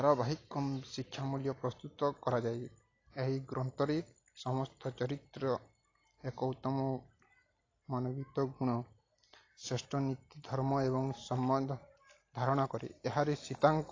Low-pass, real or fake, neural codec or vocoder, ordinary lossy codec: 7.2 kHz; real; none; AAC, 32 kbps